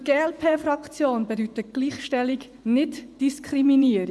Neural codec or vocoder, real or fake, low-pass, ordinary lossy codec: vocoder, 24 kHz, 100 mel bands, Vocos; fake; none; none